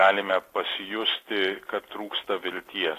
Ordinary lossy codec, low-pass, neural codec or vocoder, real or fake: AAC, 48 kbps; 14.4 kHz; none; real